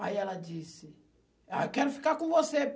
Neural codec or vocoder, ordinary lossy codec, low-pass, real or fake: none; none; none; real